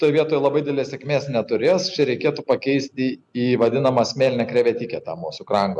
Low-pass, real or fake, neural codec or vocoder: 10.8 kHz; real; none